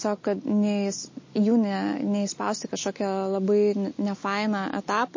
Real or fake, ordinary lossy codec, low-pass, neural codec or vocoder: real; MP3, 32 kbps; 7.2 kHz; none